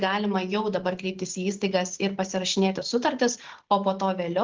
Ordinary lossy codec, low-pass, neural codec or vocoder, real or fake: Opus, 16 kbps; 7.2 kHz; none; real